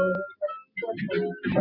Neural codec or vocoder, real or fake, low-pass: none; real; 5.4 kHz